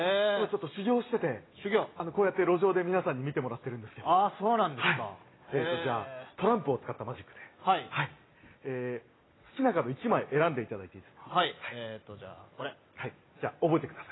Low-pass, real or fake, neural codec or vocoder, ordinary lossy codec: 7.2 kHz; real; none; AAC, 16 kbps